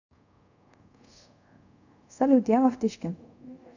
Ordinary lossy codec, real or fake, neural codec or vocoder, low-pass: none; fake; codec, 24 kHz, 0.5 kbps, DualCodec; 7.2 kHz